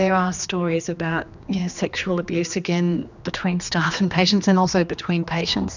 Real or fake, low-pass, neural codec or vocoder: fake; 7.2 kHz; codec, 16 kHz, 2 kbps, X-Codec, HuBERT features, trained on general audio